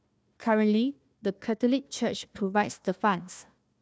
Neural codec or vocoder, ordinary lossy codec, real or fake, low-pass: codec, 16 kHz, 1 kbps, FunCodec, trained on Chinese and English, 50 frames a second; none; fake; none